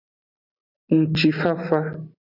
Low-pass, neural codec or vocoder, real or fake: 5.4 kHz; none; real